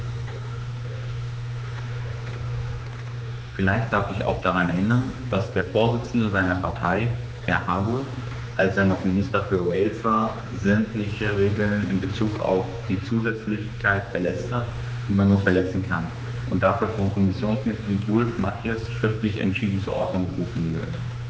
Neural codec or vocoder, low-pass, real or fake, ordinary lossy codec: codec, 16 kHz, 2 kbps, X-Codec, HuBERT features, trained on general audio; none; fake; none